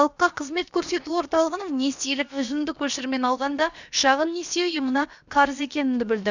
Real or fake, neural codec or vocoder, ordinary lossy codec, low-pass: fake; codec, 16 kHz, about 1 kbps, DyCAST, with the encoder's durations; none; 7.2 kHz